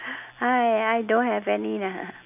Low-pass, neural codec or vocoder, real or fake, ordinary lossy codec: 3.6 kHz; none; real; none